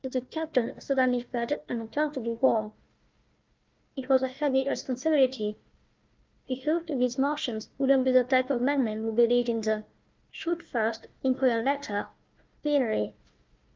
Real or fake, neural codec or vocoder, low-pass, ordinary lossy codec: fake; codec, 16 kHz, 1 kbps, FunCodec, trained on Chinese and English, 50 frames a second; 7.2 kHz; Opus, 24 kbps